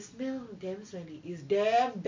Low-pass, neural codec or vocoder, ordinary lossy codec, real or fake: 7.2 kHz; none; MP3, 48 kbps; real